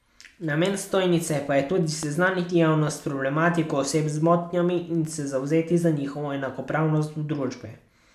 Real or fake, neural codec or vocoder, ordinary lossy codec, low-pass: real; none; none; 14.4 kHz